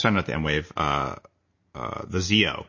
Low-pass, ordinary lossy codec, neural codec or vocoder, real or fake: 7.2 kHz; MP3, 32 kbps; none; real